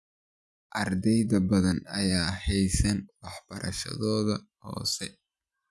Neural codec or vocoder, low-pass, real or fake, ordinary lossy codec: none; none; real; none